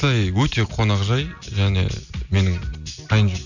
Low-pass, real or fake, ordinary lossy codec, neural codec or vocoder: 7.2 kHz; real; none; none